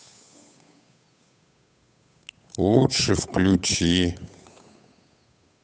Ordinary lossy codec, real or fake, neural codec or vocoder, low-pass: none; fake; codec, 16 kHz, 8 kbps, FunCodec, trained on Chinese and English, 25 frames a second; none